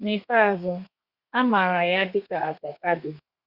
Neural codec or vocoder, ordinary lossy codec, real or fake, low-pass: codec, 32 kHz, 1.9 kbps, SNAC; Opus, 64 kbps; fake; 5.4 kHz